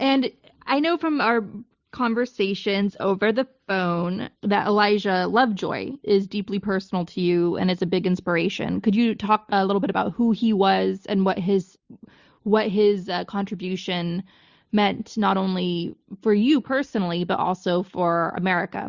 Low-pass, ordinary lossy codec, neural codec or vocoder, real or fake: 7.2 kHz; Opus, 64 kbps; none; real